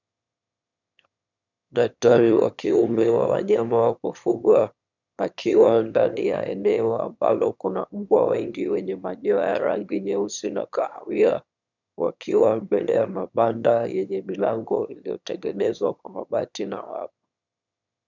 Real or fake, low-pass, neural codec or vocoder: fake; 7.2 kHz; autoencoder, 22.05 kHz, a latent of 192 numbers a frame, VITS, trained on one speaker